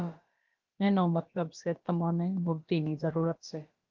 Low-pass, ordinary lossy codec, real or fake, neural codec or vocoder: 7.2 kHz; Opus, 32 kbps; fake; codec, 16 kHz, about 1 kbps, DyCAST, with the encoder's durations